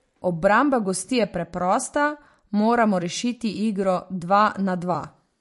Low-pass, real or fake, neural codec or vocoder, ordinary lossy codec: 14.4 kHz; fake; vocoder, 44.1 kHz, 128 mel bands every 256 samples, BigVGAN v2; MP3, 48 kbps